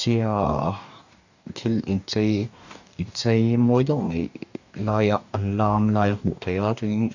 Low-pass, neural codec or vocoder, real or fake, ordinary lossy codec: 7.2 kHz; codec, 44.1 kHz, 2.6 kbps, DAC; fake; none